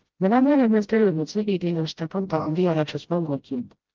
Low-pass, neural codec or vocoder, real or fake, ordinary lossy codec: 7.2 kHz; codec, 16 kHz, 0.5 kbps, FreqCodec, smaller model; fake; Opus, 32 kbps